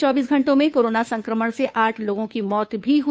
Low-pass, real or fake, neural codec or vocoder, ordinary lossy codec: none; fake; codec, 16 kHz, 2 kbps, FunCodec, trained on Chinese and English, 25 frames a second; none